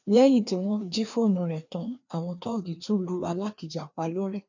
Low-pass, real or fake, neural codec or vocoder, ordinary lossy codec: 7.2 kHz; fake; codec, 16 kHz, 2 kbps, FreqCodec, larger model; none